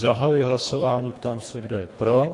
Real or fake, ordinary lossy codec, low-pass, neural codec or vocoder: fake; AAC, 48 kbps; 10.8 kHz; codec, 24 kHz, 1.5 kbps, HILCodec